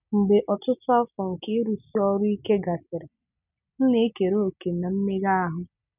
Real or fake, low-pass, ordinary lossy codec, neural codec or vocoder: real; 3.6 kHz; none; none